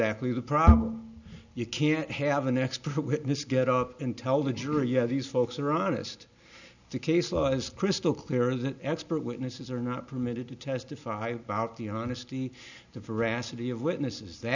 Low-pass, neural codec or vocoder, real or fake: 7.2 kHz; none; real